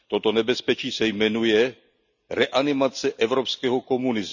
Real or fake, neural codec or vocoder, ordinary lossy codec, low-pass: real; none; none; 7.2 kHz